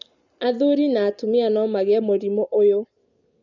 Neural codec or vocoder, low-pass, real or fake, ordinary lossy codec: none; 7.2 kHz; real; none